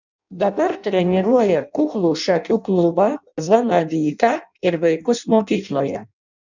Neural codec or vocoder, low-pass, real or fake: codec, 16 kHz in and 24 kHz out, 0.6 kbps, FireRedTTS-2 codec; 7.2 kHz; fake